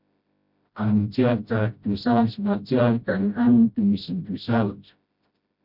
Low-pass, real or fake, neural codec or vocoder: 5.4 kHz; fake; codec, 16 kHz, 0.5 kbps, FreqCodec, smaller model